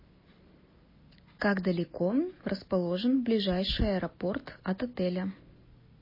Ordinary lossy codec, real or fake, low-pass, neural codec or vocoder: MP3, 24 kbps; real; 5.4 kHz; none